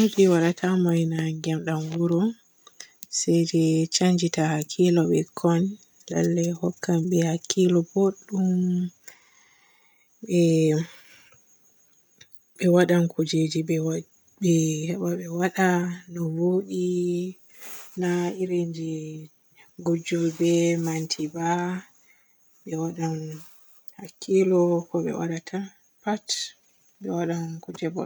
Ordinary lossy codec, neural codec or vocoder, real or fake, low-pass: none; none; real; none